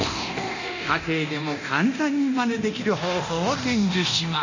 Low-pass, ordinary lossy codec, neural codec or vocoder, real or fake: 7.2 kHz; none; codec, 24 kHz, 0.9 kbps, DualCodec; fake